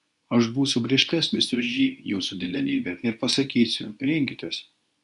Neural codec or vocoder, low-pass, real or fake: codec, 24 kHz, 0.9 kbps, WavTokenizer, medium speech release version 2; 10.8 kHz; fake